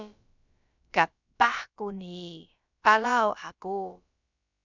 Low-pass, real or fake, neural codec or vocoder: 7.2 kHz; fake; codec, 16 kHz, about 1 kbps, DyCAST, with the encoder's durations